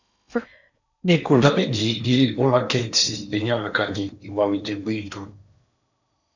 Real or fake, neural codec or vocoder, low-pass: fake; codec, 16 kHz in and 24 kHz out, 0.8 kbps, FocalCodec, streaming, 65536 codes; 7.2 kHz